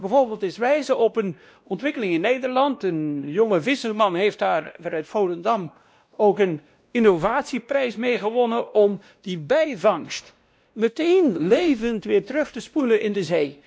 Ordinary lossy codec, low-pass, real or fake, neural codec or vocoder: none; none; fake; codec, 16 kHz, 1 kbps, X-Codec, WavLM features, trained on Multilingual LibriSpeech